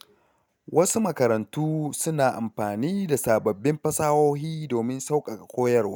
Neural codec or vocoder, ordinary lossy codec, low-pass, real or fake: none; none; none; real